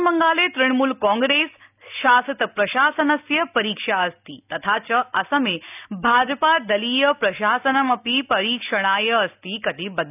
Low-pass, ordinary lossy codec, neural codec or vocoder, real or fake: 3.6 kHz; none; none; real